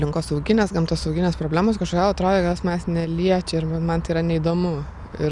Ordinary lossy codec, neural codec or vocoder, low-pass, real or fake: Opus, 64 kbps; none; 10.8 kHz; real